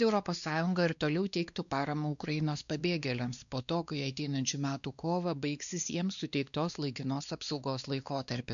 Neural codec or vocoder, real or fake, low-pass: codec, 16 kHz, 2 kbps, X-Codec, WavLM features, trained on Multilingual LibriSpeech; fake; 7.2 kHz